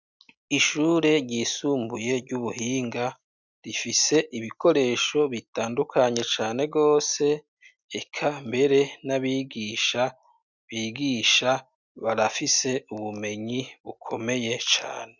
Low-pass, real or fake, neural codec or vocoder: 7.2 kHz; real; none